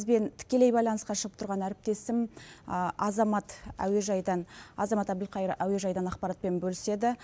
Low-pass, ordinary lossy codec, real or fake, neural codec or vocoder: none; none; real; none